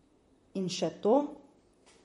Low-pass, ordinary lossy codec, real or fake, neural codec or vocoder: 19.8 kHz; MP3, 48 kbps; fake; vocoder, 44.1 kHz, 128 mel bands, Pupu-Vocoder